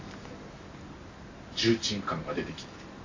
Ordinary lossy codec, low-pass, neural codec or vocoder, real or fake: AAC, 48 kbps; 7.2 kHz; none; real